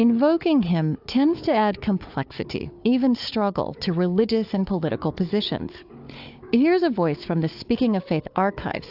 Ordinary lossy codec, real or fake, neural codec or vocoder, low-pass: AAC, 48 kbps; fake; codec, 16 kHz, 4 kbps, FunCodec, trained on Chinese and English, 50 frames a second; 5.4 kHz